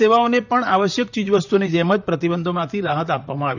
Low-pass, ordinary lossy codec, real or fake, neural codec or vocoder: 7.2 kHz; none; fake; vocoder, 44.1 kHz, 128 mel bands, Pupu-Vocoder